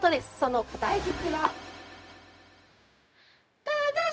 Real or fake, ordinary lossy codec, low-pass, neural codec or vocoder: fake; none; none; codec, 16 kHz, 0.4 kbps, LongCat-Audio-Codec